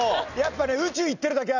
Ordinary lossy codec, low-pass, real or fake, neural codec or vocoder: none; 7.2 kHz; real; none